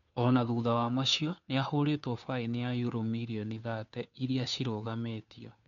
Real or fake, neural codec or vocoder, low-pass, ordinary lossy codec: fake; codec, 16 kHz, 2 kbps, FunCodec, trained on Chinese and English, 25 frames a second; 7.2 kHz; none